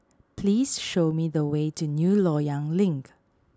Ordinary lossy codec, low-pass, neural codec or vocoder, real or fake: none; none; none; real